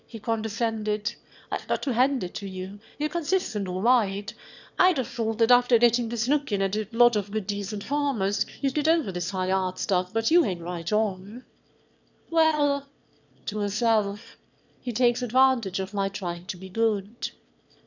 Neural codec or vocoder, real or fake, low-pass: autoencoder, 22.05 kHz, a latent of 192 numbers a frame, VITS, trained on one speaker; fake; 7.2 kHz